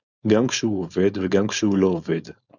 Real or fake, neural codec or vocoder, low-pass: fake; codec, 16 kHz, 4.8 kbps, FACodec; 7.2 kHz